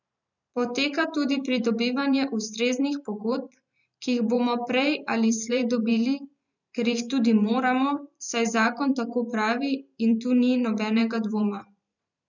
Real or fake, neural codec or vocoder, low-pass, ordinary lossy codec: fake; vocoder, 44.1 kHz, 128 mel bands every 512 samples, BigVGAN v2; 7.2 kHz; none